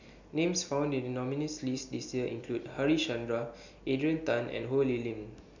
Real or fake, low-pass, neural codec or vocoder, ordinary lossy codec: real; 7.2 kHz; none; none